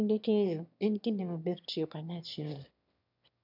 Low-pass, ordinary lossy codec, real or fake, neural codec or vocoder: 5.4 kHz; none; fake; autoencoder, 22.05 kHz, a latent of 192 numbers a frame, VITS, trained on one speaker